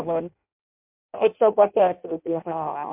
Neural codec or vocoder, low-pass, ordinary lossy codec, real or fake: codec, 16 kHz in and 24 kHz out, 0.6 kbps, FireRedTTS-2 codec; 3.6 kHz; MP3, 32 kbps; fake